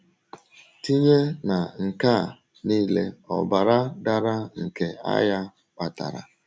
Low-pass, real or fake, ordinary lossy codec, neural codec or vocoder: none; real; none; none